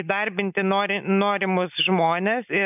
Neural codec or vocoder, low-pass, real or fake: none; 3.6 kHz; real